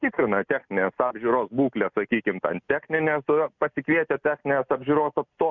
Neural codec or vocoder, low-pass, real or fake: none; 7.2 kHz; real